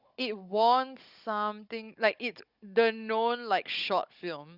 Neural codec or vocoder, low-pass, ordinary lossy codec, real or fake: codec, 16 kHz, 16 kbps, FunCodec, trained on Chinese and English, 50 frames a second; 5.4 kHz; Opus, 64 kbps; fake